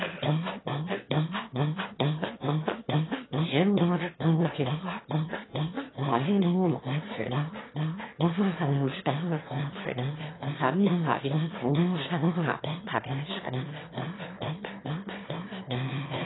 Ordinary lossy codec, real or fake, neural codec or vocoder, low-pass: AAC, 16 kbps; fake; autoencoder, 22.05 kHz, a latent of 192 numbers a frame, VITS, trained on one speaker; 7.2 kHz